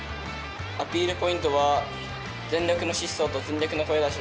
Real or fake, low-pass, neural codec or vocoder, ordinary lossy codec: real; none; none; none